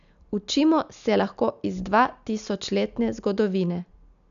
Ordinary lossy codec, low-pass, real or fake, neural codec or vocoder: MP3, 96 kbps; 7.2 kHz; real; none